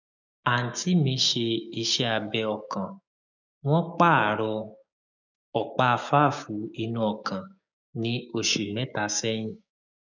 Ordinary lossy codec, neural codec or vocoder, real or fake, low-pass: none; codec, 16 kHz, 6 kbps, DAC; fake; 7.2 kHz